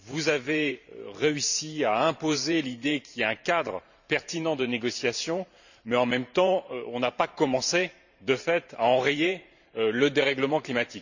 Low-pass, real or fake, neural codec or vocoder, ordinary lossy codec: 7.2 kHz; fake; vocoder, 44.1 kHz, 128 mel bands every 512 samples, BigVGAN v2; none